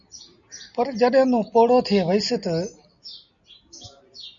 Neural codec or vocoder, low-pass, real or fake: none; 7.2 kHz; real